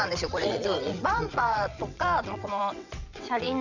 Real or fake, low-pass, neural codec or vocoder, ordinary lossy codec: fake; 7.2 kHz; vocoder, 22.05 kHz, 80 mel bands, WaveNeXt; none